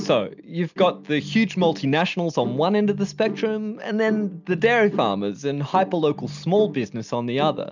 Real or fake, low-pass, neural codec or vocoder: real; 7.2 kHz; none